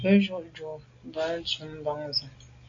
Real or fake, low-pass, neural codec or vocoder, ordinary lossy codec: real; 7.2 kHz; none; AAC, 48 kbps